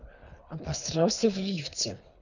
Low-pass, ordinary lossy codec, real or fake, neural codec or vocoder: 7.2 kHz; none; fake; codec, 24 kHz, 3 kbps, HILCodec